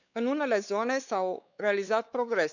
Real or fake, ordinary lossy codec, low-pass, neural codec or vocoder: fake; none; 7.2 kHz; codec, 16 kHz, 4 kbps, X-Codec, WavLM features, trained on Multilingual LibriSpeech